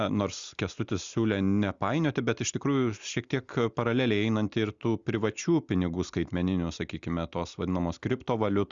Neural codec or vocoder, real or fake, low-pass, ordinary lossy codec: none; real; 7.2 kHz; Opus, 64 kbps